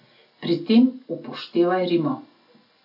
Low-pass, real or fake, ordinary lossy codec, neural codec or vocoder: 5.4 kHz; real; none; none